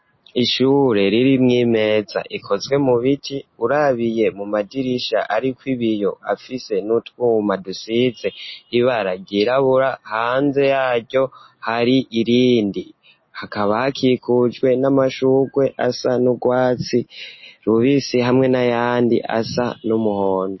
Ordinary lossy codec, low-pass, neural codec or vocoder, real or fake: MP3, 24 kbps; 7.2 kHz; none; real